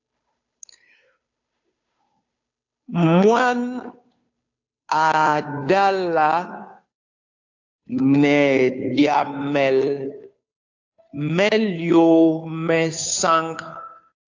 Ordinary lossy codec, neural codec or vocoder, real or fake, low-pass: AAC, 48 kbps; codec, 16 kHz, 2 kbps, FunCodec, trained on Chinese and English, 25 frames a second; fake; 7.2 kHz